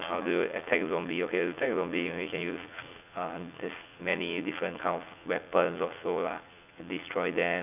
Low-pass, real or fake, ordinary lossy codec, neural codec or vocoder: 3.6 kHz; fake; none; vocoder, 44.1 kHz, 80 mel bands, Vocos